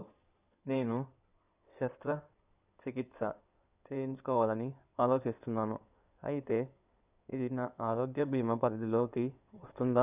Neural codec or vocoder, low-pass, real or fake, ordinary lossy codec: codec, 16 kHz in and 24 kHz out, 2.2 kbps, FireRedTTS-2 codec; 3.6 kHz; fake; none